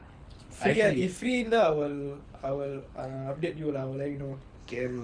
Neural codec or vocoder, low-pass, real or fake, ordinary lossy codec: codec, 24 kHz, 6 kbps, HILCodec; 9.9 kHz; fake; none